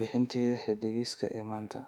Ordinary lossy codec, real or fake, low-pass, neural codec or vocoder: none; fake; 19.8 kHz; autoencoder, 48 kHz, 32 numbers a frame, DAC-VAE, trained on Japanese speech